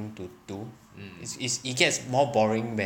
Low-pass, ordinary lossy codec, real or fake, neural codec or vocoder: 19.8 kHz; none; real; none